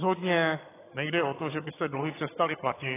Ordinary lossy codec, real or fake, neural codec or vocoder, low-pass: AAC, 16 kbps; fake; vocoder, 22.05 kHz, 80 mel bands, HiFi-GAN; 3.6 kHz